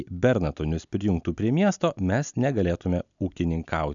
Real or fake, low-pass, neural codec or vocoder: real; 7.2 kHz; none